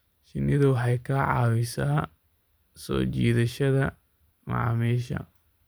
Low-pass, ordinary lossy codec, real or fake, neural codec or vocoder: none; none; fake; vocoder, 44.1 kHz, 128 mel bands every 512 samples, BigVGAN v2